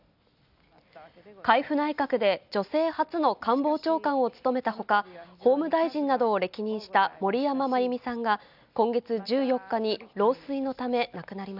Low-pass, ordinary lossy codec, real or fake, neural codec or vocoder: 5.4 kHz; none; real; none